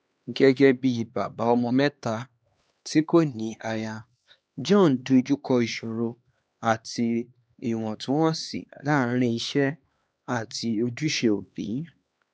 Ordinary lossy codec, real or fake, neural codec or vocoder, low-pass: none; fake; codec, 16 kHz, 2 kbps, X-Codec, HuBERT features, trained on LibriSpeech; none